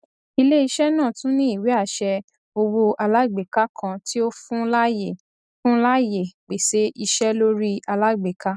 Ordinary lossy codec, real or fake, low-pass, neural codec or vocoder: none; real; none; none